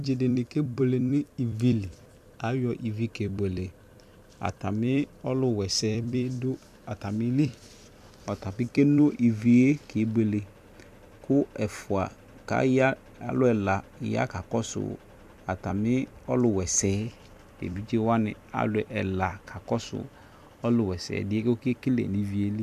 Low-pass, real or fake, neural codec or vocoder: 14.4 kHz; fake; vocoder, 44.1 kHz, 128 mel bands every 256 samples, BigVGAN v2